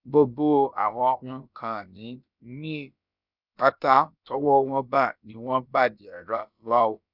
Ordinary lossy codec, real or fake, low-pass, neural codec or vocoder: none; fake; 5.4 kHz; codec, 16 kHz, about 1 kbps, DyCAST, with the encoder's durations